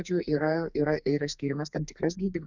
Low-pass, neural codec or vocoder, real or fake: 7.2 kHz; codec, 44.1 kHz, 2.6 kbps, SNAC; fake